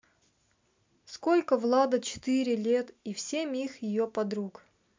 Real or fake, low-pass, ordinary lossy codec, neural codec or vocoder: real; 7.2 kHz; MP3, 64 kbps; none